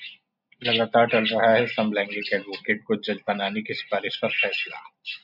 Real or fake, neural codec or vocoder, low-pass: real; none; 5.4 kHz